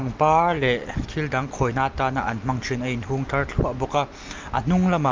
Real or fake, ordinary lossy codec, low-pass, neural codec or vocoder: real; Opus, 24 kbps; 7.2 kHz; none